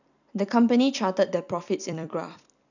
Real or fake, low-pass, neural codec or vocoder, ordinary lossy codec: fake; 7.2 kHz; vocoder, 44.1 kHz, 128 mel bands every 256 samples, BigVGAN v2; none